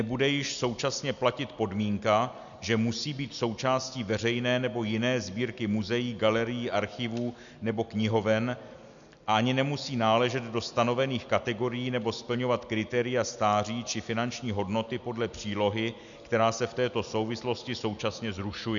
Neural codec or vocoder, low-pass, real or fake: none; 7.2 kHz; real